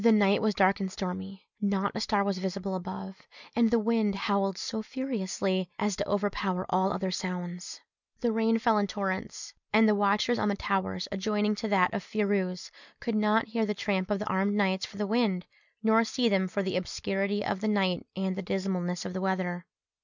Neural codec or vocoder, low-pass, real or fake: none; 7.2 kHz; real